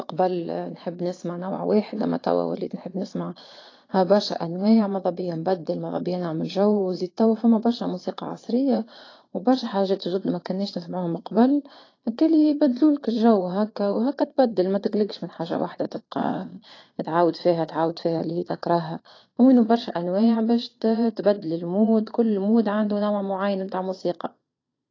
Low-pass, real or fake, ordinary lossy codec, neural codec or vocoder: 7.2 kHz; fake; AAC, 32 kbps; vocoder, 22.05 kHz, 80 mel bands, Vocos